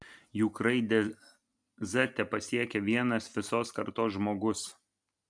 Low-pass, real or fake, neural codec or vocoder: 9.9 kHz; real; none